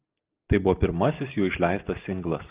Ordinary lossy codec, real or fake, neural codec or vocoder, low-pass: Opus, 32 kbps; real; none; 3.6 kHz